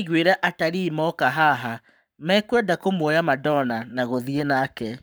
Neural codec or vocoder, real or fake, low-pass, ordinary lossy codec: codec, 44.1 kHz, 7.8 kbps, Pupu-Codec; fake; none; none